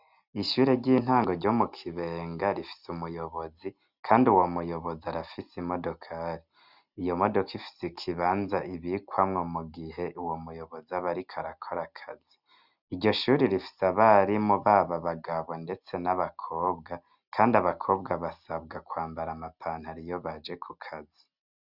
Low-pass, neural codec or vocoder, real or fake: 5.4 kHz; none; real